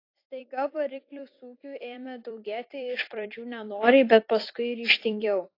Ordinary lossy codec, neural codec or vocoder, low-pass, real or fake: AAC, 32 kbps; vocoder, 44.1 kHz, 128 mel bands every 256 samples, BigVGAN v2; 5.4 kHz; fake